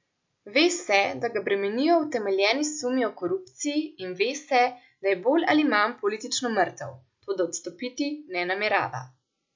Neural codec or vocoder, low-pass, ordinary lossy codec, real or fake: none; 7.2 kHz; MP3, 64 kbps; real